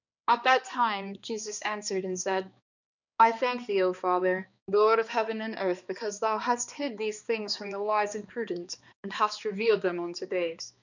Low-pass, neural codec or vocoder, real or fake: 7.2 kHz; codec, 16 kHz, 2 kbps, X-Codec, HuBERT features, trained on balanced general audio; fake